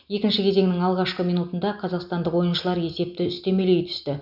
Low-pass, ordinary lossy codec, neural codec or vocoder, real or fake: 5.4 kHz; none; none; real